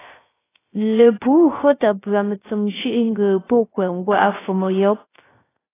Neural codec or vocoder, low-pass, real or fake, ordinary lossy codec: codec, 16 kHz, 0.3 kbps, FocalCodec; 3.6 kHz; fake; AAC, 16 kbps